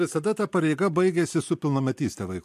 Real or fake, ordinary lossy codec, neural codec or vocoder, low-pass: real; MP3, 64 kbps; none; 14.4 kHz